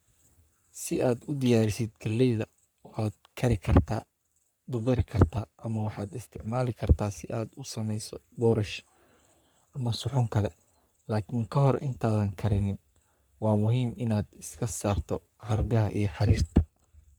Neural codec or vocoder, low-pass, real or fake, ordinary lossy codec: codec, 44.1 kHz, 3.4 kbps, Pupu-Codec; none; fake; none